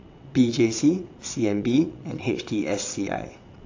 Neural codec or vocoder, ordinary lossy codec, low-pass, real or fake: vocoder, 22.05 kHz, 80 mel bands, WaveNeXt; AAC, 48 kbps; 7.2 kHz; fake